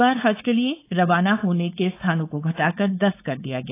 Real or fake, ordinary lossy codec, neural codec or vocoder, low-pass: fake; AAC, 24 kbps; codec, 16 kHz, 4.8 kbps, FACodec; 3.6 kHz